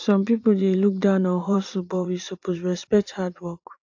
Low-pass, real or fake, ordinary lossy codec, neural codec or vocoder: 7.2 kHz; fake; AAC, 48 kbps; vocoder, 44.1 kHz, 80 mel bands, Vocos